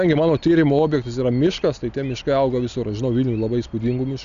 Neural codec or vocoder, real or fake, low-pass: none; real; 7.2 kHz